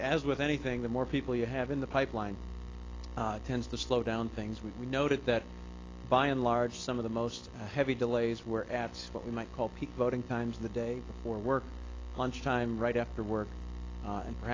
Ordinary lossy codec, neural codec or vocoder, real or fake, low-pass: AAC, 32 kbps; none; real; 7.2 kHz